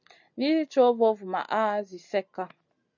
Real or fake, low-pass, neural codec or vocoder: real; 7.2 kHz; none